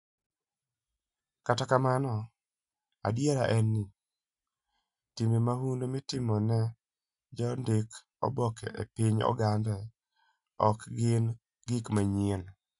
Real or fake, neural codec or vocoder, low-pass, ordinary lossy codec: real; none; 10.8 kHz; none